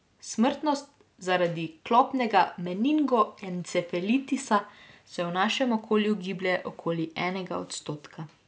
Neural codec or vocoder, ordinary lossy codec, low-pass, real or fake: none; none; none; real